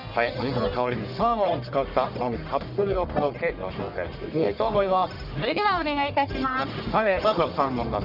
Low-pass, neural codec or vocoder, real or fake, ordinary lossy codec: 5.4 kHz; codec, 44.1 kHz, 1.7 kbps, Pupu-Codec; fake; none